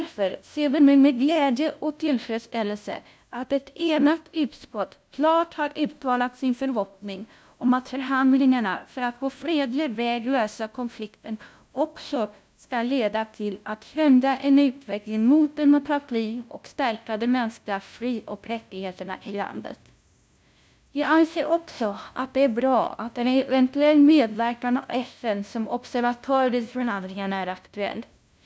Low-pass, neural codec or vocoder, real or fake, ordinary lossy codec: none; codec, 16 kHz, 0.5 kbps, FunCodec, trained on LibriTTS, 25 frames a second; fake; none